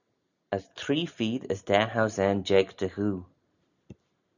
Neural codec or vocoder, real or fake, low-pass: none; real; 7.2 kHz